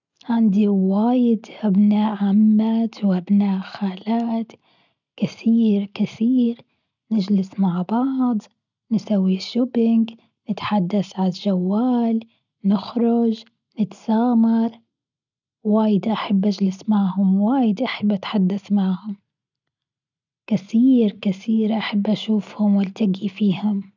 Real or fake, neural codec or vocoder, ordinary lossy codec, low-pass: real; none; none; 7.2 kHz